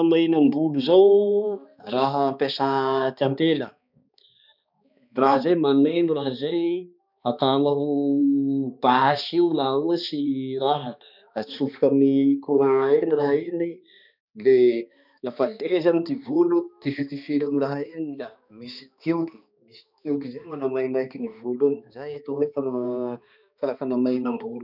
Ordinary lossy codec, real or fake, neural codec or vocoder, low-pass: none; fake; codec, 16 kHz, 2 kbps, X-Codec, HuBERT features, trained on balanced general audio; 5.4 kHz